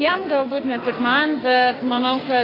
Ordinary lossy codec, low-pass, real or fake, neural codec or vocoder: AAC, 24 kbps; 5.4 kHz; fake; codec, 44.1 kHz, 2.6 kbps, SNAC